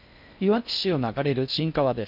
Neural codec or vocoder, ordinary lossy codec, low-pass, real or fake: codec, 16 kHz in and 24 kHz out, 0.6 kbps, FocalCodec, streaming, 4096 codes; none; 5.4 kHz; fake